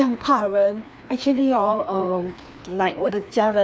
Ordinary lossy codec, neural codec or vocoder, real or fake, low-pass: none; codec, 16 kHz, 2 kbps, FreqCodec, larger model; fake; none